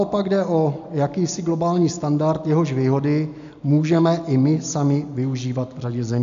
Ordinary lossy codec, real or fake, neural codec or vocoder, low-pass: MP3, 64 kbps; real; none; 7.2 kHz